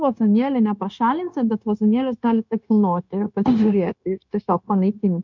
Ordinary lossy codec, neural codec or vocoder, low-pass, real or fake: MP3, 64 kbps; codec, 16 kHz, 0.9 kbps, LongCat-Audio-Codec; 7.2 kHz; fake